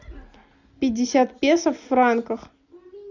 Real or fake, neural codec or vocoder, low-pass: real; none; 7.2 kHz